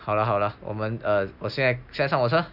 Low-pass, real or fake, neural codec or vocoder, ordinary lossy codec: 5.4 kHz; real; none; none